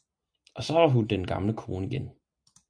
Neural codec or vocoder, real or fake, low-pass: none; real; 9.9 kHz